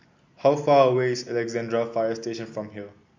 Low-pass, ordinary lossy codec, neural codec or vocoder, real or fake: 7.2 kHz; MP3, 48 kbps; none; real